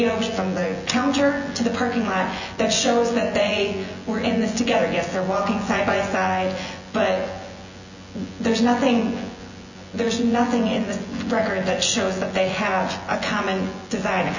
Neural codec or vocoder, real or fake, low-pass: vocoder, 24 kHz, 100 mel bands, Vocos; fake; 7.2 kHz